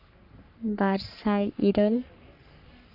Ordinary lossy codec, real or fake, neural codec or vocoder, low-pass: none; fake; codec, 44.1 kHz, 3.4 kbps, Pupu-Codec; 5.4 kHz